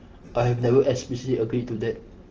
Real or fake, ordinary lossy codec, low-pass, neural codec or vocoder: real; Opus, 16 kbps; 7.2 kHz; none